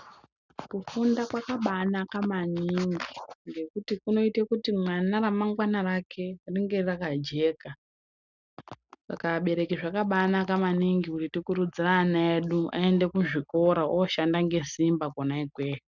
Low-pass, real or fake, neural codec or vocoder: 7.2 kHz; real; none